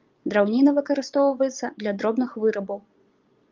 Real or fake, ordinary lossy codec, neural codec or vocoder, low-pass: fake; Opus, 32 kbps; vocoder, 22.05 kHz, 80 mel bands, Vocos; 7.2 kHz